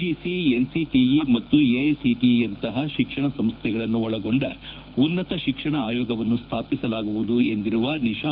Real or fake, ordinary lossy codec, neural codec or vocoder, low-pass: fake; none; codec, 24 kHz, 6 kbps, HILCodec; 5.4 kHz